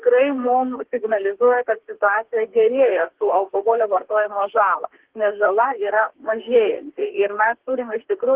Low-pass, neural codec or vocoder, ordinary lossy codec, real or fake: 3.6 kHz; codec, 32 kHz, 1.9 kbps, SNAC; Opus, 16 kbps; fake